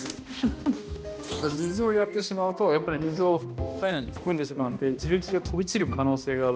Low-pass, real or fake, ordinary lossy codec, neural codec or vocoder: none; fake; none; codec, 16 kHz, 1 kbps, X-Codec, HuBERT features, trained on balanced general audio